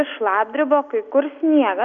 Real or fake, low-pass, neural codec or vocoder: real; 7.2 kHz; none